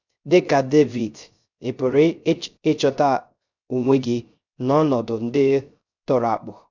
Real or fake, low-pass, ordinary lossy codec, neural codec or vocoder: fake; 7.2 kHz; none; codec, 16 kHz, 0.3 kbps, FocalCodec